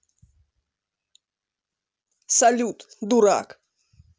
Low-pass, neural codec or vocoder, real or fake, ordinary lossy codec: none; none; real; none